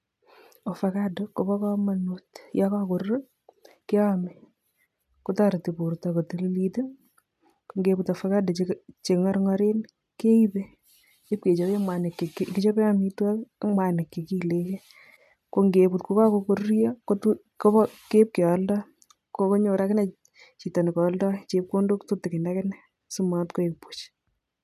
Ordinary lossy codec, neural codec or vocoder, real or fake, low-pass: none; none; real; 14.4 kHz